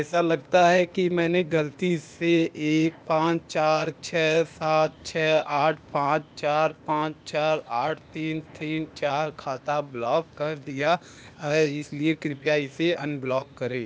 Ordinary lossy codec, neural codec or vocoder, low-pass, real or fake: none; codec, 16 kHz, 0.8 kbps, ZipCodec; none; fake